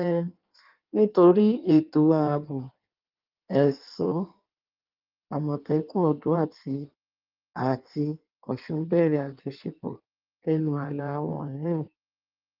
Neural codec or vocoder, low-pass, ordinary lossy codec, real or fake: codec, 16 kHz in and 24 kHz out, 1.1 kbps, FireRedTTS-2 codec; 5.4 kHz; Opus, 24 kbps; fake